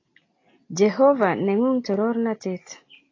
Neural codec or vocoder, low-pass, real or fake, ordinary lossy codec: none; 7.2 kHz; real; AAC, 32 kbps